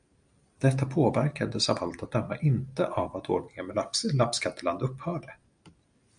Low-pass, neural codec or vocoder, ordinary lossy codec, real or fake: 9.9 kHz; none; MP3, 96 kbps; real